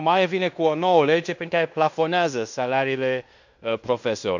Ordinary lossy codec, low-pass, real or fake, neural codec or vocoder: none; 7.2 kHz; fake; codec, 16 kHz in and 24 kHz out, 0.9 kbps, LongCat-Audio-Codec, fine tuned four codebook decoder